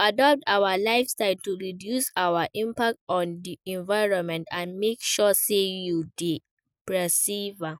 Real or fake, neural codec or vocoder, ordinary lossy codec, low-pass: real; none; none; none